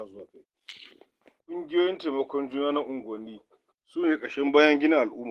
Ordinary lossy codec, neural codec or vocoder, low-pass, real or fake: Opus, 16 kbps; none; 14.4 kHz; real